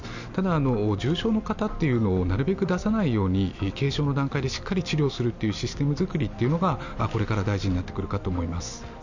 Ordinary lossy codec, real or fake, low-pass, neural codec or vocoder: none; real; 7.2 kHz; none